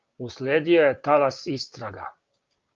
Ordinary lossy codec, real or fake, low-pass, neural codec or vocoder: Opus, 32 kbps; fake; 7.2 kHz; codec, 16 kHz, 6 kbps, DAC